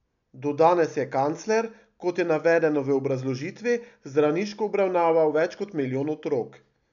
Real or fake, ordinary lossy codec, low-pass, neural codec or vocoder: real; none; 7.2 kHz; none